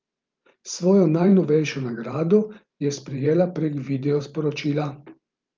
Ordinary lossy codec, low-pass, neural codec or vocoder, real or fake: Opus, 24 kbps; 7.2 kHz; vocoder, 44.1 kHz, 128 mel bands every 512 samples, BigVGAN v2; fake